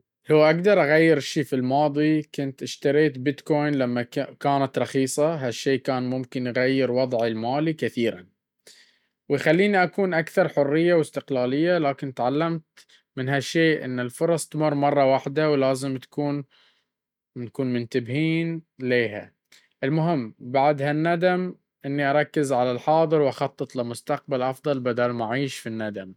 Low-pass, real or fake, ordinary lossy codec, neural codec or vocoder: 19.8 kHz; real; none; none